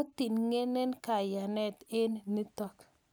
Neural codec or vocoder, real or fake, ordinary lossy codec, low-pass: none; real; none; none